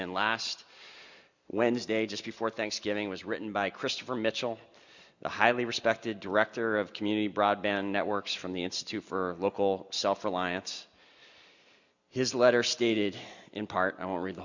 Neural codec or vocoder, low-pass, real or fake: none; 7.2 kHz; real